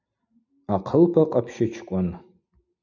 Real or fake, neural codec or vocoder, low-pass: real; none; 7.2 kHz